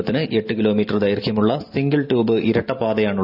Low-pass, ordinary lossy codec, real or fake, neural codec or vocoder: 5.4 kHz; none; real; none